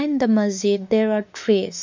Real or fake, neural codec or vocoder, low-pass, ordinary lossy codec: fake; autoencoder, 48 kHz, 32 numbers a frame, DAC-VAE, trained on Japanese speech; 7.2 kHz; MP3, 64 kbps